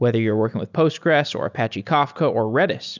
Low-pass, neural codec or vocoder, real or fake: 7.2 kHz; none; real